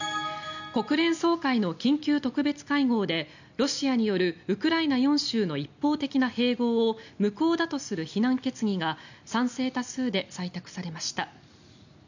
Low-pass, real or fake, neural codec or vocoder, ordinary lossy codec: 7.2 kHz; real; none; none